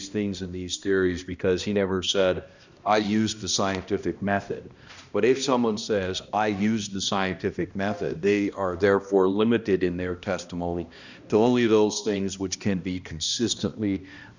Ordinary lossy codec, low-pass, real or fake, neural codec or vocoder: Opus, 64 kbps; 7.2 kHz; fake; codec, 16 kHz, 1 kbps, X-Codec, HuBERT features, trained on balanced general audio